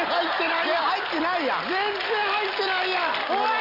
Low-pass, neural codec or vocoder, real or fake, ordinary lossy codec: 5.4 kHz; none; real; none